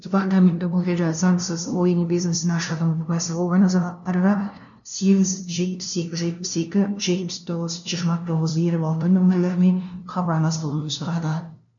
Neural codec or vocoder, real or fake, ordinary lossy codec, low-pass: codec, 16 kHz, 0.5 kbps, FunCodec, trained on LibriTTS, 25 frames a second; fake; none; 7.2 kHz